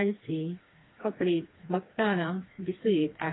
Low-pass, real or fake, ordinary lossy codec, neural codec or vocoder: 7.2 kHz; fake; AAC, 16 kbps; codec, 16 kHz, 2 kbps, FreqCodec, smaller model